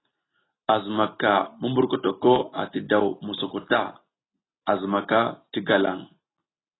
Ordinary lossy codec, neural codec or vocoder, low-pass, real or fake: AAC, 16 kbps; none; 7.2 kHz; real